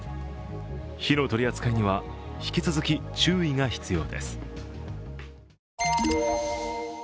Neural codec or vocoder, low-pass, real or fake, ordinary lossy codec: none; none; real; none